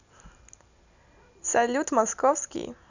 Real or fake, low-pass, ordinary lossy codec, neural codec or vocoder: real; 7.2 kHz; none; none